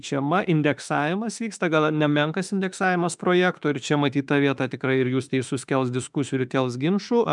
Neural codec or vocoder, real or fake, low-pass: autoencoder, 48 kHz, 32 numbers a frame, DAC-VAE, trained on Japanese speech; fake; 10.8 kHz